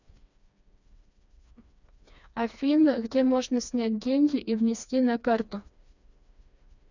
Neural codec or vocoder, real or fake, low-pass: codec, 16 kHz, 2 kbps, FreqCodec, smaller model; fake; 7.2 kHz